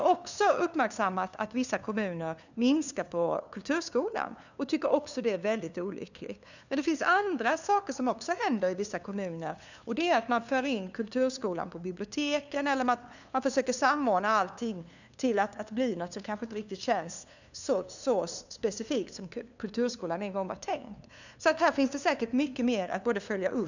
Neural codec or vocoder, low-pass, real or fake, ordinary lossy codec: codec, 16 kHz, 2 kbps, FunCodec, trained on LibriTTS, 25 frames a second; 7.2 kHz; fake; none